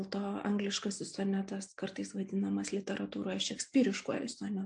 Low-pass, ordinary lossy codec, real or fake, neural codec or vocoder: 9.9 kHz; AAC, 64 kbps; real; none